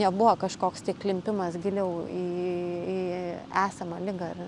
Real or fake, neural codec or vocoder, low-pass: real; none; 10.8 kHz